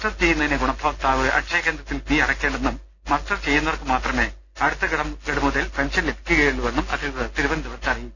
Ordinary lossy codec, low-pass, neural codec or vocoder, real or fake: none; none; none; real